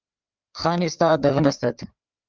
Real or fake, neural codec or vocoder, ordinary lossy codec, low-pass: fake; codec, 16 kHz, 4 kbps, FreqCodec, larger model; Opus, 24 kbps; 7.2 kHz